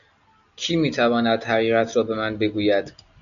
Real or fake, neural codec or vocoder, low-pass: real; none; 7.2 kHz